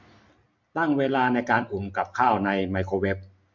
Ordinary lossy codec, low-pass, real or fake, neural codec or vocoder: MP3, 64 kbps; 7.2 kHz; real; none